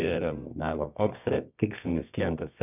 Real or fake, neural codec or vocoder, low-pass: fake; codec, 24 kHz, 0.9 kbps, WavTokenizer, medium music audio release; 3.6 kHz